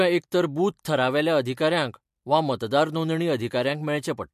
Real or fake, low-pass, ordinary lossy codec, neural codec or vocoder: real; 14.4 kHz; MP3, 64 kbps; none